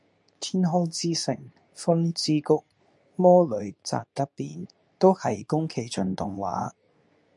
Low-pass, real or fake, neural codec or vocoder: 10.8 kHz; fake; codec, 24 kHz, 0.9 kbps, WavTokenizer, medium speech release version 2